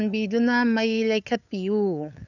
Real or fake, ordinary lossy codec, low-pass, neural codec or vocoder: fake; none; 7.2 kHz; codec, 16 kHz, 8 kbps, FunCodec, trained on LibriTTS, 25 frames a second